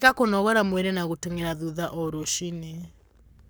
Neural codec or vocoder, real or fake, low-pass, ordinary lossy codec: codec, 44.1 kHz, 7.8 kbps, Pupu-Codec; fake; none; none